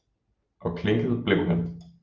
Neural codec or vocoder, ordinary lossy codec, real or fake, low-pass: none; Opus, 24 kbps; real; 7.2 kHz